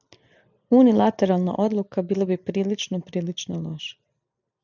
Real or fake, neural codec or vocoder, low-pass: real; none; 7.2 kHz